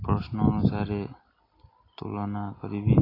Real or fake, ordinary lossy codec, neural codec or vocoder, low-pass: real; AAC, 24 kbps; none; 5.4 kHz